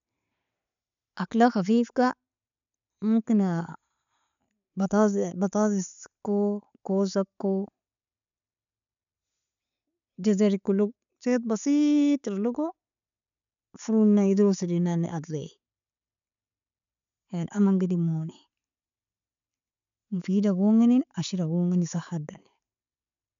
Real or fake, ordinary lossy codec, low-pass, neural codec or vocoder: real; none; 7.2 kHz; none